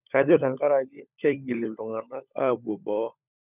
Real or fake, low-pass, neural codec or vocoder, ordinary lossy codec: fake; 3.6 kHz; codec, 16 kHz, 16 kbps, FunCodec, trained on LibriTTS, 50 frames a second; none